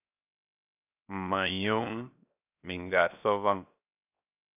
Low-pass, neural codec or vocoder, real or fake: 3.6 kHz; codec, 16 kHz, 0.7 kbps, FocalCodec; fake